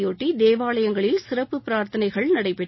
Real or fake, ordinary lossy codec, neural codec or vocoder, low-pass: real; MP3, 24 kbps; none; 7.2 kHz